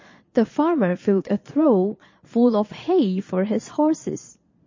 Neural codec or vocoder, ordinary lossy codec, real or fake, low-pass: vocoder, 22.05 kHz, 80 mel bands, Vocos; MP3, 32 kbps; fake; 7.2 kHz